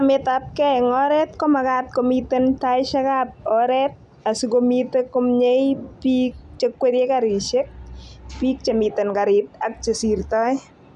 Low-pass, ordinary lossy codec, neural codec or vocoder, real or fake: 10.8 kHz; none; none; real